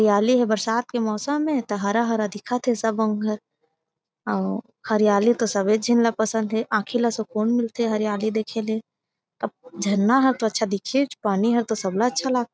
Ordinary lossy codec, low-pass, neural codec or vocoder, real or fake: none; none; none; real